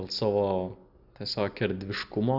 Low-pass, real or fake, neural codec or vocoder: 5.4 kHz; real; none